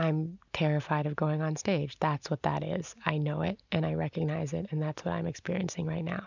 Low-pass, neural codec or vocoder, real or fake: 7.2 kHz; none; real